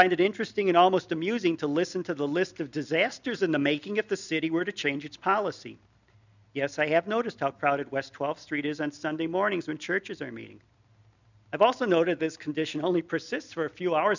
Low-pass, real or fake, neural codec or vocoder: 7.2 kHz; real; none